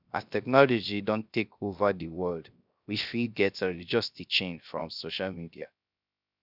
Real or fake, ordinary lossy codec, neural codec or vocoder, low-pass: fake; none; codec, 16 kHz, 0.3 kbps, FocalCodec; 5.4 kHz